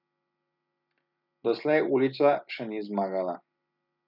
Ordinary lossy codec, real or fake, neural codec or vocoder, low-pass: none; real; none; 5.4 kHz